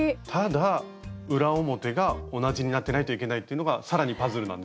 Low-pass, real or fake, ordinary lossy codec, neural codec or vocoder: none; real; none; none